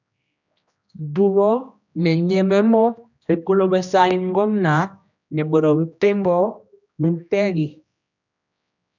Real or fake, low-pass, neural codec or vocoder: fake; 7.2 kHz; codec, 16 kHz, 1 kbps, X-Codec, HuBERT features, trained on general audio